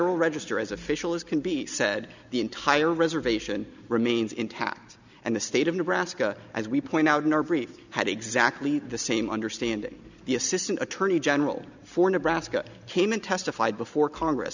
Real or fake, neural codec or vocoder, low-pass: real; none; 7.2 kHz